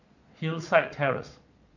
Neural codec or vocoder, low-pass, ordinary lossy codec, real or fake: vocoder, 22.05 kHz, 80 mel bands, Vocos; 7.2 kHz; none; fake